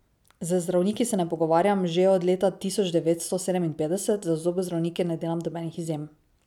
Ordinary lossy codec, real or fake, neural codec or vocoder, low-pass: none; real; none; 19.8 kHz